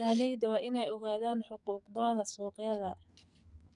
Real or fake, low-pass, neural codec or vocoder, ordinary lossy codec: fake; 10.8 kHz; codec, 44.1 kHz, 2.6 kbps, SNAC; none